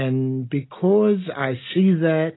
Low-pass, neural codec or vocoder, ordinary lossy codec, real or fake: 7.2 kHz; none; AAC, 16 kbps; real